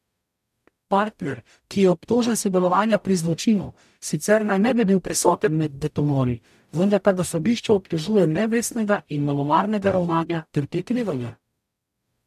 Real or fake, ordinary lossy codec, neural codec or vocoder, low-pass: fake; AAC, 96 kbps; codec, 44.1 kHz, 0.9 kbps, DAC; 14.4 kHz